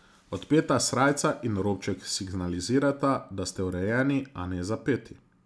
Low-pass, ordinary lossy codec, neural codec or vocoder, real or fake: none; none; none; real